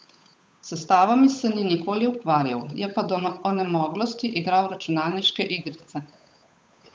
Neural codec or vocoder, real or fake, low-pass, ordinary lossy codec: codec, 16 kHz, 8 kbps, FunCodec, trained on Chinese and English, 25 frames a second; fake; none; none